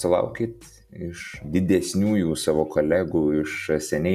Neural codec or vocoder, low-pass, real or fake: none; 14.4 kHz; real